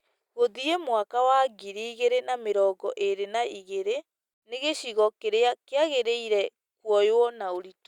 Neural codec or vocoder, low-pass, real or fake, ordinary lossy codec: none; 19.8 kHz; real; Opus, 64 kbps